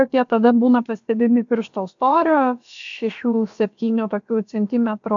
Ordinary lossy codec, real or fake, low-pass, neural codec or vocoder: AAC, 64 kbps; fake; 7.2 kHz; codec, 16 kHz, about 1 kbps, DyCAST, with the encoder's durations